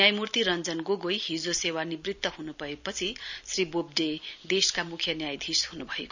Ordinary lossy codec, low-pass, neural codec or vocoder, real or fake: none; 7.2 kHz; none; real